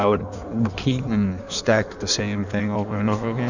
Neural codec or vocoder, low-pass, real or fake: codec, 16 kHz in and 24 kHz out, 1.1 kbps, FireRedTTS-2 codec; 7.2 kHz; fake